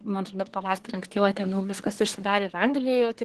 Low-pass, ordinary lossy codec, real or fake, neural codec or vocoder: 10.8 kHz; Opus, 16 kbps; fake; codec, 24 kHz, 1 kbps, SNAC